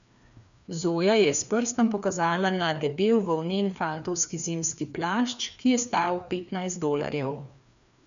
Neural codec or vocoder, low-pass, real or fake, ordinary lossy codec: codec, 16 kHz, 2 kbps, FreqCodec, larger model; 7.2 kHz; fake; none